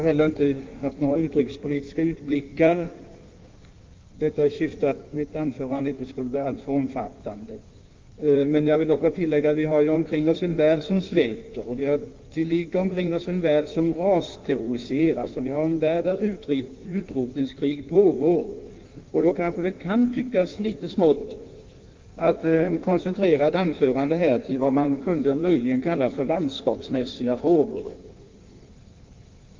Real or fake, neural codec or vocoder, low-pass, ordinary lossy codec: fake; codec, 16 kHz in and 24 kHz out, 1.1 kbps, FireRedTTS-2 codec; 7.2 kHz; Opus, 24 kbps